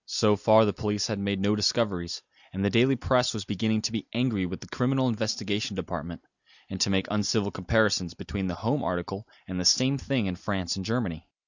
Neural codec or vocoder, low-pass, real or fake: none; 7.2 kHz; real